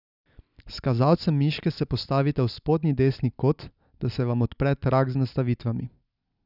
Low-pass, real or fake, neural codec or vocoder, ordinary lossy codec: 5.4 kHz; real; none; none